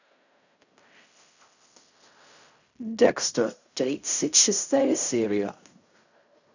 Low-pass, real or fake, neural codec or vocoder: 7.2 kHz; fake; codec, 16 kHz in and 24 kHz out, 0.4 kbps, LongCat-Audio-Codec, fine tuned four codebook decoder